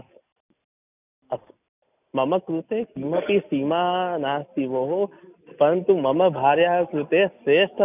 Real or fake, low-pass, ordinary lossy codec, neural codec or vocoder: real; 3.6 kHz; none; none